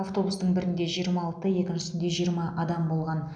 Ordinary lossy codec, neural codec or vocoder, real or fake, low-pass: none; none; real; 9.9 kHz